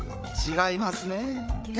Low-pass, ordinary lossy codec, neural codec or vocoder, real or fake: none; none; codec, 16 kHz, 8 kbps, FreqCodec, larger model; fake